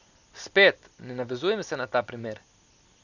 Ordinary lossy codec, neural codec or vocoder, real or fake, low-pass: none; none; real; 7.2 kHz